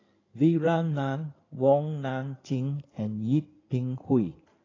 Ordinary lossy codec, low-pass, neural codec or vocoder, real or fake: AAC, 32 kbps; 7.2 kHz; codec, 16 kHz in and 24 kHz out, 2.2 kbps, FireRedTTS-2 codec; fake